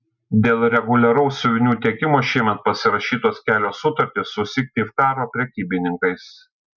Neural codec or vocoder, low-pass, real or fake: none; 7.2 kHz; real